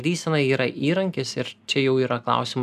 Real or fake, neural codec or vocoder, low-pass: real; none; 14.4 kHz